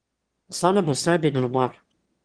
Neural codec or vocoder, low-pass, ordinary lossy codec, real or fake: autoencoder, 22.05 kHz, a latent of 192 numbers a frame, VITS, trained on one speaker; 9.9 kHz; Opus, 16 kbps; fake